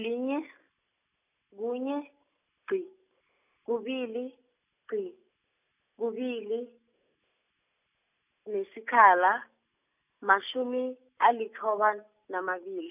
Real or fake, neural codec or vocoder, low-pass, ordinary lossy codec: real; none; 3.6 kHz; none